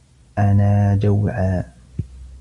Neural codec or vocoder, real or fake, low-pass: none; real; 10.8 kHz